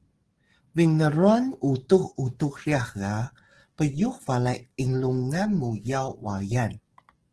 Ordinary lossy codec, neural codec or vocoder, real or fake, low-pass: Opus, 16 kbps; codec, 44.1 kHz, 7.8 kbps, DAC; fake; 10.8 kHz